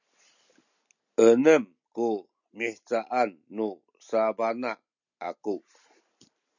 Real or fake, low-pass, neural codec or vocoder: real; 7.2 kHz; none